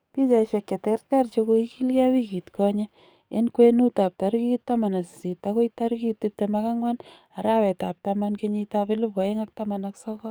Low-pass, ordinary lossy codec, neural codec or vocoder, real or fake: none; none; codec, 44.1 kHz, 7.8 kbps, DAC; fake